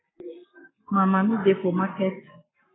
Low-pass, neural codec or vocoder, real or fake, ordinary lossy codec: 7.2 kHz; codec, 44.1 kHz, 7.8 kbps, Pupu-Codec; fake; AAC, 16 kbps